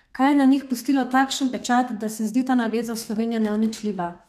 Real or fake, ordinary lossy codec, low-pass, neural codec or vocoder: fake; none; 14.4 kHz; codec, 32 kHz, 1.9 kbps, SNAC